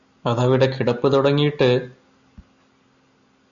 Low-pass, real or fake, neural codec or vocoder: 7.2 kHz; real; none